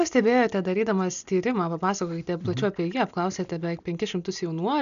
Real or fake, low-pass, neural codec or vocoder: real; 7.2 kHz; none